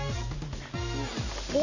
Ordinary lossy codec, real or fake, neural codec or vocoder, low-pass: none; real; none; 7.2 kHz